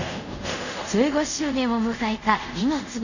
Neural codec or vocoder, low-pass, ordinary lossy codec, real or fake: codec, 24 kHz, 0.5 kbps, DualCodec; 7.2 kHz; none; fake